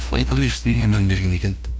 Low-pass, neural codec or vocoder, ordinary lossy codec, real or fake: none; codec, 16 kHz, 0.5 kbps, FunCodec, trained on LibriTTS, 25 frames a second; none; fake